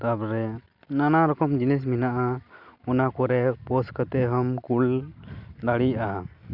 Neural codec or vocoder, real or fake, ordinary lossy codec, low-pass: none; real; none; 5.4 kHz